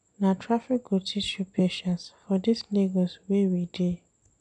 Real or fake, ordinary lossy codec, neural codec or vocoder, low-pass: real; none; none; 9.9 kHz